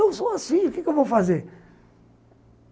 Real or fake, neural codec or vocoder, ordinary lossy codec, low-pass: real; none; none; none